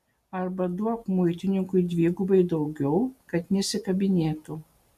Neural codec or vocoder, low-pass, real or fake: none; 14.4 kHz; real